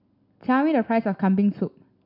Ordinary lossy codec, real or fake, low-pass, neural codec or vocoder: none; real; 5.4 kHz; none